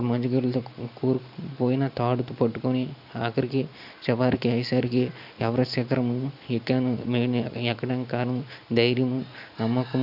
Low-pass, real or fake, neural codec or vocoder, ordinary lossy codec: 5.4 kHz; real; none; none